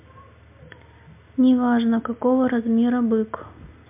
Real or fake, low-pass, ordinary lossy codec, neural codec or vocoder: real; 3.6 kHz; MP3, 32 kbps; none